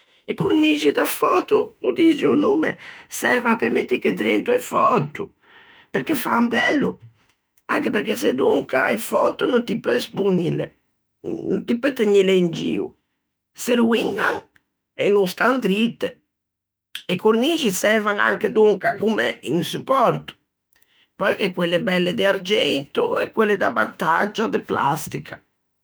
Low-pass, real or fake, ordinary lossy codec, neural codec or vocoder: none; fake; none; autoencoder, 48 kHz, 32 numbers a frame, DAC-VAE, trained on Japanese speech